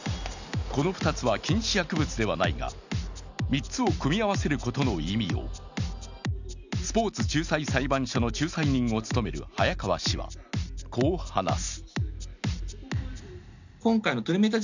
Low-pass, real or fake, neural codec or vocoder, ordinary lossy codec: 7.2 kHz; real; none; none